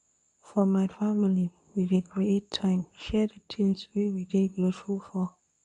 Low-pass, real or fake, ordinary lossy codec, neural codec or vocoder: 10.8 kHz; fake; MP3, 96 kbps; codec, 24 kHz, 0.9 kbps, WavTokenizer, medium speech release version 2